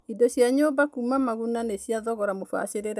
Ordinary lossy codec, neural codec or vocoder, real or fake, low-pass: none; none; real; none